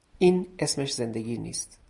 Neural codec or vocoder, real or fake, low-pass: none; real; 10.8 kHz